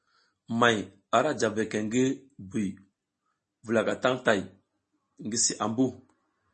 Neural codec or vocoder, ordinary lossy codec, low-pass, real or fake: none; MP3, 32 kbps; 10.8 kHz; real